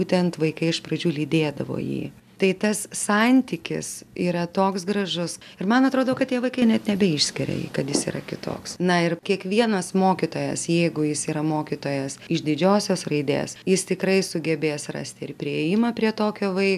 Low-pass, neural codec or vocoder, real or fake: 14.4 kHz; none; real